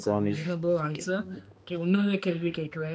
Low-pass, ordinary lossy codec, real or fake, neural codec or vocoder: none; none; fake; codec, 16 kHz, 2 kbps, X-Codec, HuBERT features, trained on balanced general audio